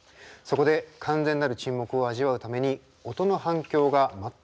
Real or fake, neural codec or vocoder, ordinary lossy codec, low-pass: real; none; none; none